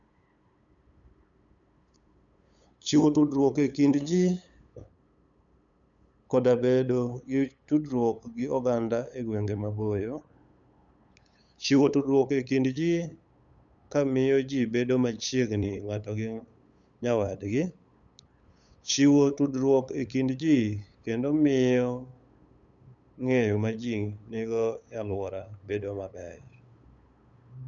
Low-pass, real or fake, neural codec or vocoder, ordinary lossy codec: 7.2 kHz; fake; codec, 16 kHz, 8 kbps, FunCodec, trained on LibriTTS, 25 frames a second; none